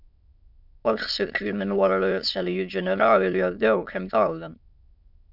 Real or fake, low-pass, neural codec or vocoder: fake; 5.4 kHz; autoencoder, 22.05 kHz, a latent of 192 numbers a frame, VITS, trained on many speakers